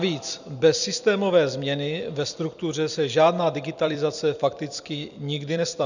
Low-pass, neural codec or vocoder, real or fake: 7.2 kHz; vocoder, 44.1 kHz, 128 mel bands every 256 samples, BigVGAN v2; fake